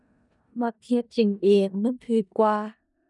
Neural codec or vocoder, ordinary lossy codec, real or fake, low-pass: codec, 16 kHz in and 24 kHz out, 0.4 kbps, LongCat-Audio-Codec, four codebook decoder; none; fake; 10.8 kHz